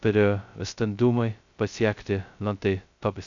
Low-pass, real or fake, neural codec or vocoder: 7.2 kHz; fake; codec, 16 kHz, 0.2 kbps, FocalCodec